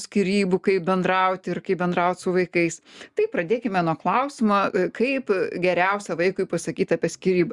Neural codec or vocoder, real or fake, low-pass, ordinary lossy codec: none; real; 10.8 kHz; Opus, 64 kbps